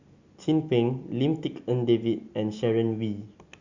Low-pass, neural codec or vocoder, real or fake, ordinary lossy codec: 7.2 kHz; none; real; Opus, 64 kbps